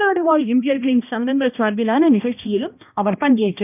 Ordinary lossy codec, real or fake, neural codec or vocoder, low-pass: none; fake; codec, 16 kHz, 1 kbps, X-Codec, HuBERT features, trained on balanced general audio; 3.6 kHz